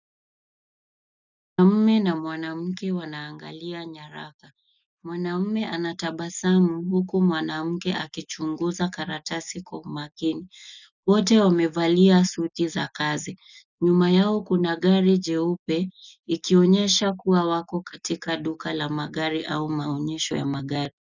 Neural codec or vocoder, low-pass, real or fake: none; 7.2 kHz; real